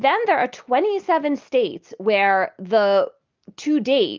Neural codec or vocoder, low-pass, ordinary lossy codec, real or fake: none; 7.2 kHz; Opus, 32 kbps; real